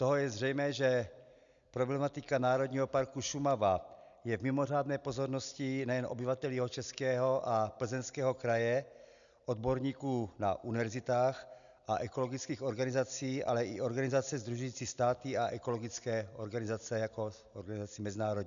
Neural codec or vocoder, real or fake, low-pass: none; real; 7.2 kHz